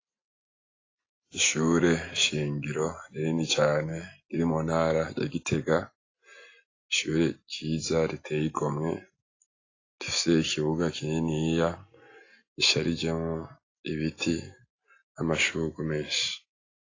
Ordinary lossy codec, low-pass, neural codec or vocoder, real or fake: AAC, 32 kbps; 7.2 kHz; none; real